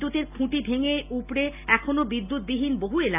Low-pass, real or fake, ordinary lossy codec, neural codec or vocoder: 3.6 kHz; real; AAC, 32 kbps; none